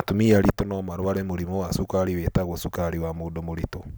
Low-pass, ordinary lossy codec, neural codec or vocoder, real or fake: none; none; none; real